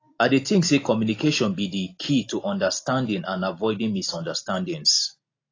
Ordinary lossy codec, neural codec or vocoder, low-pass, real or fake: AAC, 32 kbps; none; 7.2 kHz; real